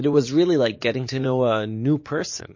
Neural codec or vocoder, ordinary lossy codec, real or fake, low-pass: vocoder, 44.1 kHz, 80 mel bands, Vocos; MP3, 32 kbps; fake; 7.2 kHz